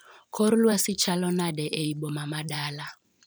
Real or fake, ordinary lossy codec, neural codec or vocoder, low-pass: fake; none; vocoder, 44.1 kHz, 128 mel bands every 512 samples, BigVGAN v2; none